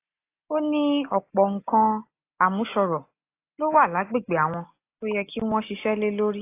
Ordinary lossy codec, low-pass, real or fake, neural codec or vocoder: AAC, 24 kbps; 3.6 kHz; real; none